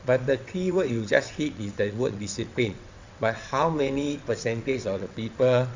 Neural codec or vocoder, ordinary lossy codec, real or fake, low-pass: vocoder, 22.05 kHz, 80 mel bands, WaveNeXt; Opus, 64 kbps; fake; 7.2 kHz